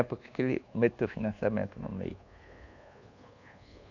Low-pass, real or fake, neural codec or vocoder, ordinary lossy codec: 7.2 kHz; fake; codec, 24 kHz, 1.2 kbps, DualCodec; none